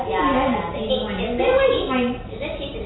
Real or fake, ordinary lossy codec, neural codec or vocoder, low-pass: real; AAC, 16 kbps; none; 7.2 kHz